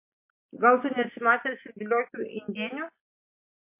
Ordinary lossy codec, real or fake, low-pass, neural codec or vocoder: MP3, 24 kbps; real; 3.6 kHz; none